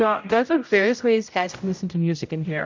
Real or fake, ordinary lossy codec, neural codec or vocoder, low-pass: fake; MP3, 64 kbps; codec, 16 kHz, 0.5 kbps, X-Codec, HuBERT features, trained on general audio; 7.2 kHz